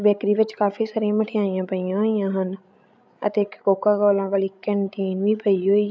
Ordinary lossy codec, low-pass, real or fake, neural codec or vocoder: none; none; fake; codec, 16 kHz, 16 kbps, FreqCodec, larger model